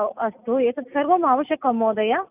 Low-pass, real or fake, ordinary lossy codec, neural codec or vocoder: 3.6 kHz; real; none; none